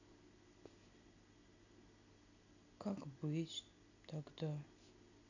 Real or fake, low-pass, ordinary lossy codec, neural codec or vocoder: real; 7.2 kHz; none; none